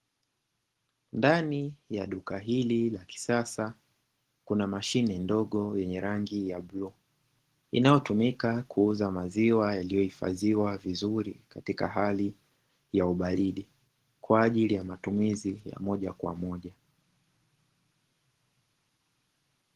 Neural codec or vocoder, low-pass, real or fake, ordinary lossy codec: none; 14.4 kHz; real; Opus, 16 kbps